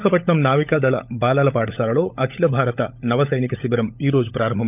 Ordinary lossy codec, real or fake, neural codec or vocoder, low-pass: none; fake; codec, 16 kHz, 16 kbps, FunCodec, trained on LibriTTS, 50 frames a second; 3.6 kHz